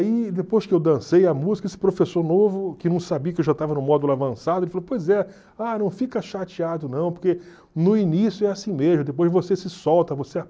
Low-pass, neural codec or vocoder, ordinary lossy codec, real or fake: none; none; none; real